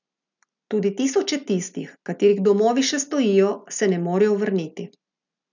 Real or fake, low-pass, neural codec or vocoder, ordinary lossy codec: real; 7.2 kHz; none; none